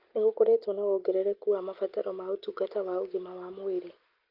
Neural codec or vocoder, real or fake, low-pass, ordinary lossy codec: none; real; 5.4 kHz; Opus, 32 kbps